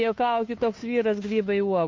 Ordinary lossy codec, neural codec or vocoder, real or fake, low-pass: MP3, 48 kbps; codec, 16 kHz, 2 kbps, FunCodec, trained on Chinese and English, 25 frames a second; fake; 7.2 kHz